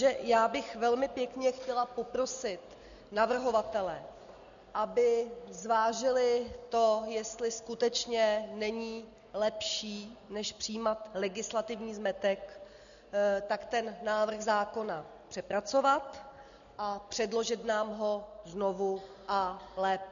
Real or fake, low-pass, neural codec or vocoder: real; 7.2 kHz; none